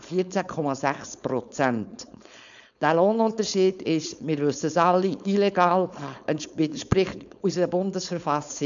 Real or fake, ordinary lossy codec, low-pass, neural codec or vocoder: fake; none; 7.2 kHz; codec, 16 kHz, 4.8 kbps, FACodec